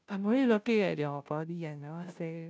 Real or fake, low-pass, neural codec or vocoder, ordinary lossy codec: fake; none; codec, 16 kHz, 0.5 kbps, FunCodec, trained on Chinese and English, 25 frames a second; none